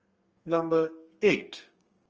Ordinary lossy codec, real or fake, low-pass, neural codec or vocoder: Opus, 24 kbps; fake; 7.2 kHz; codec, 32 kHz, 1.9 kbps, SNAC